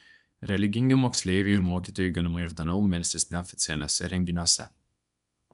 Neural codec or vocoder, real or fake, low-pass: codec, 24 kHz, 0.9 kbps, WavTokenizer, small release; fake; 10.8 kHz